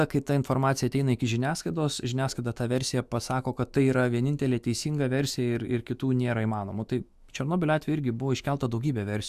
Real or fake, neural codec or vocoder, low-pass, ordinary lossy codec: fake; autoencoder, 48 kHz, 128 numbers a frame, DAC-VAE, trained on Japanese speech; 14.4 kHz; Opus, 64 kbps